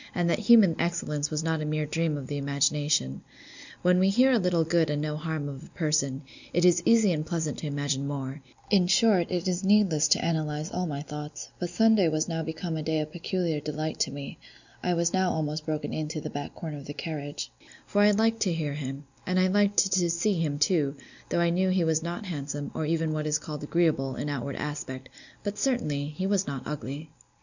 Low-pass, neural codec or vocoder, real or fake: 7.2 kHz; none; real